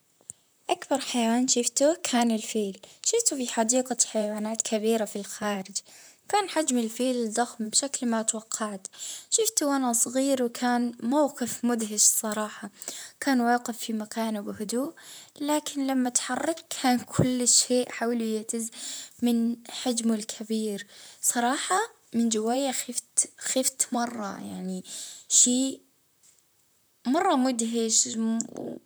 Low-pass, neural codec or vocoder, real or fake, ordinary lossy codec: none; vocoder, 44.1 kHz, 128 mel bands, Pupu-Vocoder; fake; none